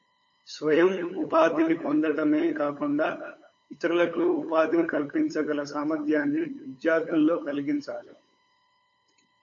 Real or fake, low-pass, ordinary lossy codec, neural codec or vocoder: fake; 7.2 kHz; AAC, 48 kbps; codec, 16 kHz, 8 kbps, FunCodec, trained on LibriTTS, 25 frames a second